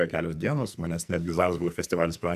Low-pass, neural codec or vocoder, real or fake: 14.4 kHz; codec, 44.1 kHz, 2.6 kbps, SNAC; fake